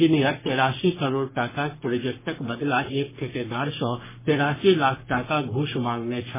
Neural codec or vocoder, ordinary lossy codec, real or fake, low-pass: codec, 44.1 kHz, 3.4 kbps, Pupu-Codec; MP3, 16 kbps; fake; 3.6 kHz